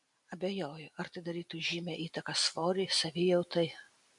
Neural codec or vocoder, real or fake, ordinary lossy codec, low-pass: none; real; MP3, 64 kbps; 10.8 kHz